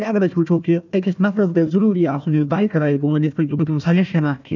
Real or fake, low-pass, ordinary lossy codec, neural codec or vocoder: fake; 7.2 kHz; none; codec, 16 kHz, 1 kbps, FunCodec, trained on Chinese and English, 50 frames a second